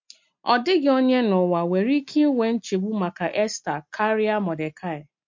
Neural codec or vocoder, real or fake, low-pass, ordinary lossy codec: none; real; 7.2 kHz; MP3, 48 kbps